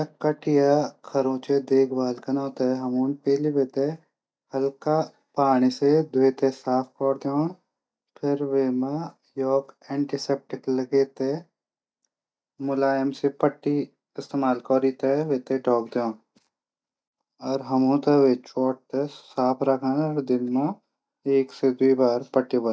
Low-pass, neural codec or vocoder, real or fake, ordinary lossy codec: none; none; real; none